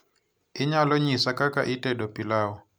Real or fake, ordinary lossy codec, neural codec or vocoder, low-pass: real; none; none; none